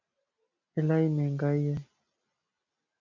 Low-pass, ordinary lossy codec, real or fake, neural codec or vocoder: 7.2 kHz; MP3, 48 kbps; real; none